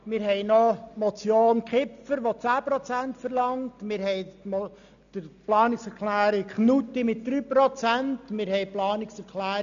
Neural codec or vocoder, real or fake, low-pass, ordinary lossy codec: none; real; 7.2 kHz; none